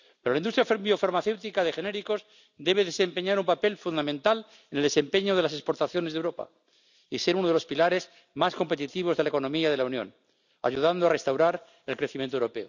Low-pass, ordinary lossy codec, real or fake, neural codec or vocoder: 7.2 kHz; none; real; none